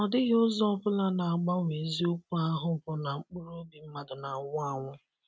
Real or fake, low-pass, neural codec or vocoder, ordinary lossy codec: real; none; none; none